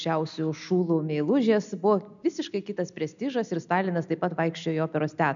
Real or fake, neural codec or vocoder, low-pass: real; none; 7.2 kHz